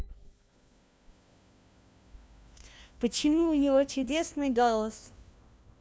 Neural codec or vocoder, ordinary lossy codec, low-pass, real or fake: codec, 16 kHz, 1 kbps, FunCodec, trained on LibriTTS, 50 frames a second; none; none; fake